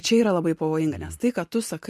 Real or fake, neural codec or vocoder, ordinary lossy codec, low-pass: real; none; MP3, 64 kbps; 14.4 kHz